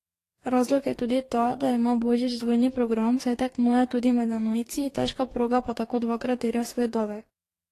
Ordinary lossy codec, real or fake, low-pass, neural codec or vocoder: AAC, 48 kbps; fake; 14.4 kHz; codec, 44.1 kHz, 2.6 kbps, DAC